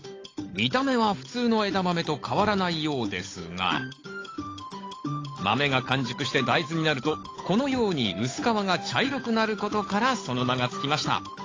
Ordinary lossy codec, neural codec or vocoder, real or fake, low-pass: AAC, 32 kbps; codec, 16 kHz, 8 kbps, FunCodec, trained on Chinese and English, 25 frames a second; fake; 7.2 kHz